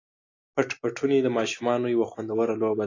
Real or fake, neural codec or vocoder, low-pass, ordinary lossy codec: real; none; 7.2 kHz; AAC, 32 kbps